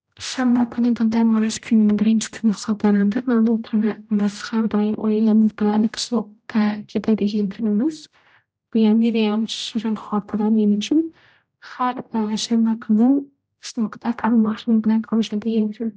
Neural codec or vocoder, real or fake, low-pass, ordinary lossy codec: codec, 16 kHz, 0.5 kbps, X-Codec, HuBERT features, trained on general audio; fake; none; none